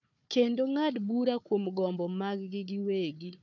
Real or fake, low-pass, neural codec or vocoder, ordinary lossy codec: fake; 7.2 kHz; codec, 16 kHz, 4 kbps, FunCodec, trained on Chinese and English, 50 frames a second; none